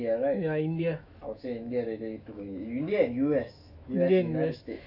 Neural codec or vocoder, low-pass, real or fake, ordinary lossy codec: autoencoder, 48 kHz, 128 numbers a frame, DAC-VAE, trained on Japanese speech; 5.4 kHz; fake; AAC, 32 kbps